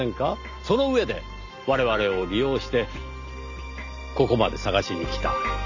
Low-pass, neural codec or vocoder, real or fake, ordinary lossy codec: 7.2 kHz; none; real; none